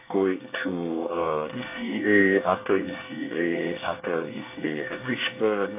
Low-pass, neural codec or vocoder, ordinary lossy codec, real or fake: 3.6 kHz; codec, 24 kHz, 1 kbps, SNAC; AAC, 24 kbps; fake